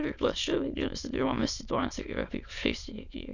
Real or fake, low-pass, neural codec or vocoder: fake; 7.2 kHz; autoencoder, 22.05 kHz, a latent of 192 numbers a frame, VITS, trained on many speakers